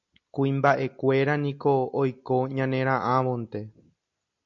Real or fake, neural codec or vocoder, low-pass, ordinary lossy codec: real; none; 7.2 kHz; MP3, 48 kbps